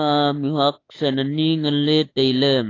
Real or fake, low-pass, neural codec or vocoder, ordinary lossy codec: fake; 7.2 kHz; autoencoder, 48 kHz, 32 numbers a frame, DAC-VAE, trained on Japanese speech; AAC, 32 kbps